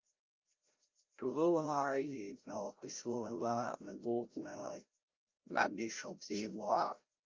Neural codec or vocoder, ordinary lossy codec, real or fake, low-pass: codec, 16 kHz, 0.5 kbps, FreqCodec, larger model; Opus, 24 kbps; fake; 7.2 kHz